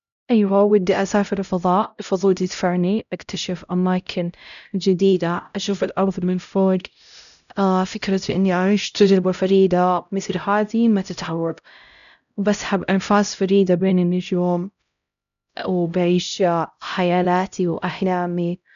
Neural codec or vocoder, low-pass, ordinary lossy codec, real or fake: codec, 16 kHz, 0.5 kbps, X-Codec, HuBERT features, trained on LibriSpeech; 7.2 kHz; none; fake